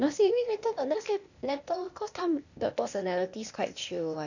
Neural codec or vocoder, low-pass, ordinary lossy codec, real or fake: codec, 16 kHz, 0.8 kbps, ZipCodec; 7.2 kHz; none; fake